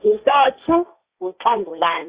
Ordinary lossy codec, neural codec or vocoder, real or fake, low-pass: Opus, 64 kbps; codec, 16 kHz, 1.1 kbps, Voila-Tokenizer; fake; 3.6 kHz